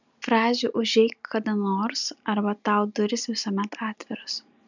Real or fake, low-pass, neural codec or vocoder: real; 7.2 kHz; none